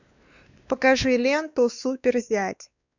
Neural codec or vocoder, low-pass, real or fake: codec, 16 kHz, 2 kbps, X-Codec, WavLM features, trained on Multilingual LibriSpeech; 7.2 kHz; fake